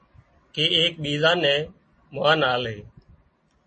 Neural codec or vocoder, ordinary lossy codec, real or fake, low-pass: none; MP3, 32 kbps; real; 10.8 kHz